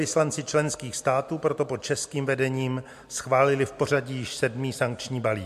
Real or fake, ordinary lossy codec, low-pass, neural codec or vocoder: real; MP3, 64 kbps; 14.4 kHz; none